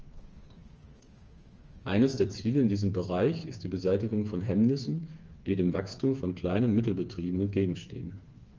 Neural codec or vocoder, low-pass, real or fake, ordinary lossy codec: codec, 16 kHz, 4 kbps, FreqCodec, smaller model; 7.2 kHz; fake; Opus, 24 kbps